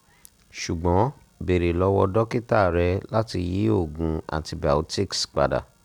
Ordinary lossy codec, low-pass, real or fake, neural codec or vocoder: none; 19.8 kHz; real; none